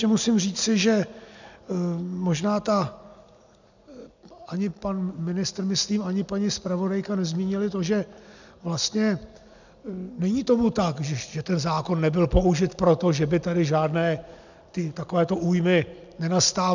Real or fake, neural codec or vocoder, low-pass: real; none; 7.2 kHz